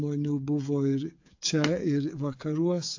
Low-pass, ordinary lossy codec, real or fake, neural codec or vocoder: 7.2 kHz; AAC, 48 kbps; fake; codec, 16 kHz, 8 kbps, FreqCodec, smaller model